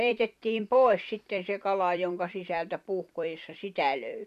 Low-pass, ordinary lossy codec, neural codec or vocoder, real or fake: 14.4 kHz; none; vocoder, 44.1 kHz, 128 mel bands every 256 samples, BigVGAN v2; fake